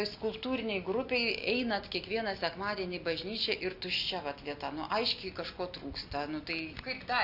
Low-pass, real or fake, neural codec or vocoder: 5.4 kHz; real; none